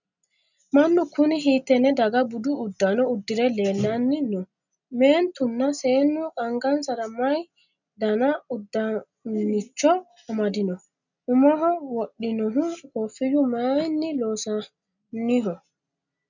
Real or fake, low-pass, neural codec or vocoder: real; 7.2 kHz; none